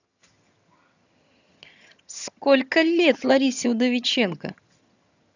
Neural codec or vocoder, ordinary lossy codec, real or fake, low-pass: vocoder, 22.05 kHz, 80 mel bands, HiFi-GAN; none; fake; 7.2 kHz